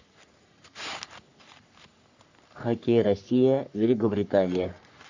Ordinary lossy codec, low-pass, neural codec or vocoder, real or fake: AAC, 48 kbps; 7.2 kHz; codec, 44.1 kHz, 3.4 kbps, Pupu-Codec; fake